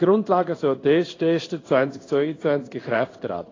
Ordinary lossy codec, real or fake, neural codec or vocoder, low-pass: AAC, 32 kbps; fake; codec, 16 kHz in and 24 kHz out, 1 kbps, XY-Tokenizer; 7.2 kHz